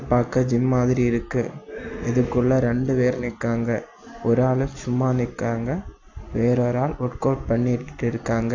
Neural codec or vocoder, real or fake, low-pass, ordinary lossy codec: none; real; 7.2 kHz; AAC, 48 kbps